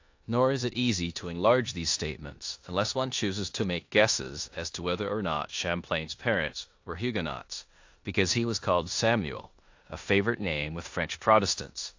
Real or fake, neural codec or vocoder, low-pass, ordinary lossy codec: fake; codec, 16 kHz in and 24 kHz out, 0.9 kbps, LongCat-Audio-Codec, four codebook decoder; 7.2 kHz; AAC, 48 kbps